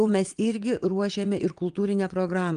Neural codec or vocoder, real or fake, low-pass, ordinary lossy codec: vocoder, 22.05 kHz, 80 mel bands, WaveNeXt; fake; 9.9 kHz; Opus, 32 kbps